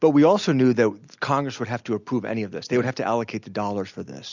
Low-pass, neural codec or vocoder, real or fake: 7.2 kHz; none; real